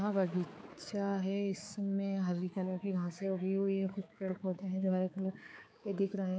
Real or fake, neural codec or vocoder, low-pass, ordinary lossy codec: fake; codec, 16 kHz, 4 kbps, X-Codec, HuBERT features, trained on balanced general audio; none; none